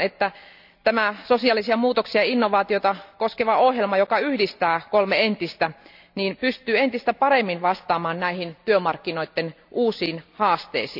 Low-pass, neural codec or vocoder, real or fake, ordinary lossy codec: 5.4 kHz; none; real; none